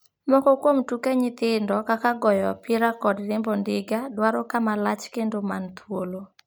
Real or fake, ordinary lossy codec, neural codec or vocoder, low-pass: real; none; none; none